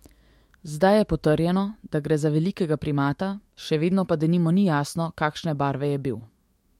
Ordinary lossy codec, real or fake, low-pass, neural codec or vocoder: MP3, 64 kbps; fake; 19.8 kHz; autoencoder, 48 kHz, 128 numbers a frame, DAC-VAE, trained on Japanese speech